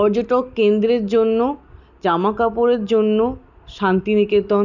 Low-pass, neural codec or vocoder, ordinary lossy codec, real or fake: 7.2 kHz; none; none; real